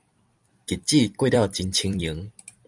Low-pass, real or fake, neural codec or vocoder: 10.8 kHz; fake; vocoder, 44.1 kHz, 128 mel bands every 256 samples, BigVGAN v2